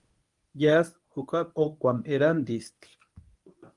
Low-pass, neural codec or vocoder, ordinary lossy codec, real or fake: 10.8 kHz; codec, 24 kHz, 0.9 kbps, WavTokenizer, medium speech release version 1; Opus, 32 kbps; fake